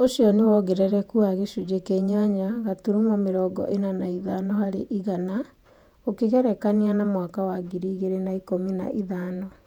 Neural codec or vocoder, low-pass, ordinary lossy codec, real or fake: vocoder, 48 kHz, 128 mel bands, Vocos; 19.8 kHz; none; fake